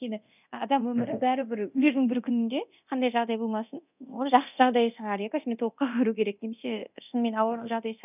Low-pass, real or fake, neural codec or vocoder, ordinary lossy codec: 3.6 kHz; fake; codec, 24 kHz, 0.9 kbps, DualCodec; none